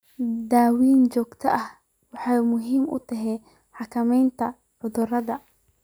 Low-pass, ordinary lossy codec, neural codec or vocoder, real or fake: none; none; none; real